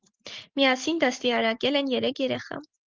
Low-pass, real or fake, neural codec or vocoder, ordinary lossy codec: 7.2 kHz; real; none; Opus, 16 kbps